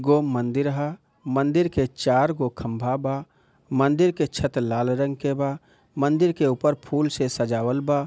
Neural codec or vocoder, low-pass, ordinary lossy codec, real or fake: none; none; none; real